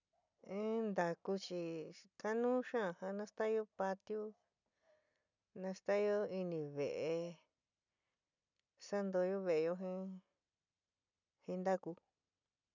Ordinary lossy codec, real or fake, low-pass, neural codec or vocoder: none; real; 7.2 kHz; none